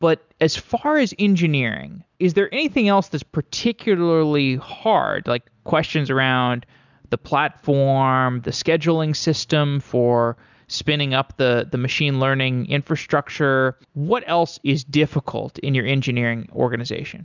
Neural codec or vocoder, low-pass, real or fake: none; 7.2 kHz; real